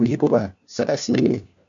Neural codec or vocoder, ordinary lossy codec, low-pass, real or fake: codec, 16 kHz, 1 kbps, FunCodec, trained on LibriTTS, 50 frames a second; MP3, 64 kbps; 7.2 kHz; fake